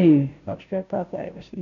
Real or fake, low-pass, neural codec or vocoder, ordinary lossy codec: fake; 7.2 kHz; codec, 16 kHz, 0.5 kbps, FunCodec, trained on Chinese and English, 25 frames a second; none